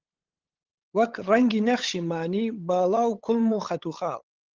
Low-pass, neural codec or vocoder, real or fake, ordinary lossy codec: 7.2 kHz; codec, 16 kHz, 8 kbps, FunCodec, trained on LibriTTS, 25 frames a second; fake; Opus, 16 kbps